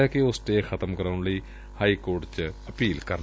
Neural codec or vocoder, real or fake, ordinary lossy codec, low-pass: none; real; none; none